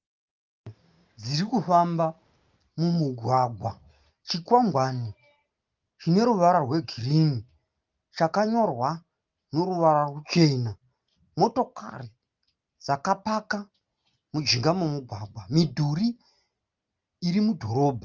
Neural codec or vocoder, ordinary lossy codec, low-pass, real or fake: none; Opus, 32 kbps; 7.2 kHz; real